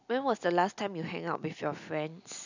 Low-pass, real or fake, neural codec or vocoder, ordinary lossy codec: 7.2 kHz; real; none; none